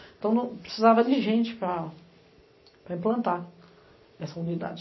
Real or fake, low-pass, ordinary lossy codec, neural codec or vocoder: real; 7.2 kHz; MP3, 24 kbps; none